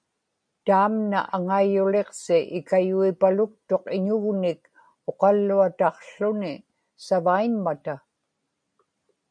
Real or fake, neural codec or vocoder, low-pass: real; none; 9.9 kHz